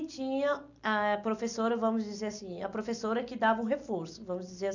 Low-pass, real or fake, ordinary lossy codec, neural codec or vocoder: 7.2 kHz; real; none; none